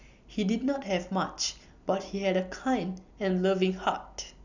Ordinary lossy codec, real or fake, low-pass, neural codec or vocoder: none; real; 7.2 kHz; none